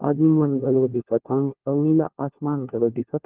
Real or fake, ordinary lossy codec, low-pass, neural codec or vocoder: fake; Opus, 16 kbps; 3.6 kHz; codec, 16 kHz, 1 kbps, FunCodec, trained on LibriTTS, 50 frames a second